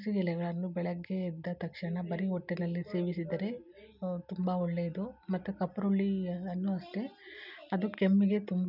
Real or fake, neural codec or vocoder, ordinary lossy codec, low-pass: real; none; none; 5.4 kHz